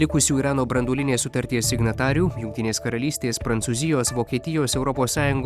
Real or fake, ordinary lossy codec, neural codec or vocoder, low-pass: fake; Opus, 64 kbps; vocoder, 44.1 kHz, 128 mel bands every 256 samples, BigVGAN v2; 14.4 kHz